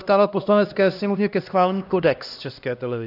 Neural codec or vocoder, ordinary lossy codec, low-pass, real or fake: codec, 16 kHz, 1 kbps, X-Codec, HuBERT features, trained on LibriSpeech; AAC, 48 kbps; 5.4 kHz; fake